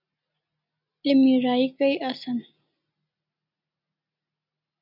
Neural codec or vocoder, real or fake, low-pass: none; real; 5.4 kHz